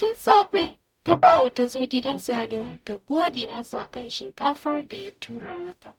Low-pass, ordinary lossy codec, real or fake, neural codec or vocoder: 19.8 kHz; none; fake; codec, 44.1 kHz, 0.9 kbps, DAC